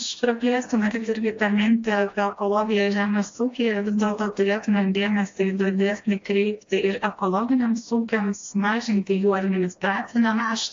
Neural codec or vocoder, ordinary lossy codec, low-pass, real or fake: codec, 16 kHz, 1 kbps, FreqCodec, smaller model; AAC, 48 kbps; 7.2 kHz; fake